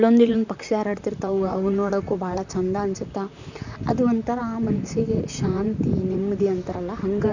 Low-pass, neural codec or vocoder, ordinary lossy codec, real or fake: 7.2 kHz; vocoder, 44.1 kHz, 128 mel bands, Pupu-Vocoder; none; fake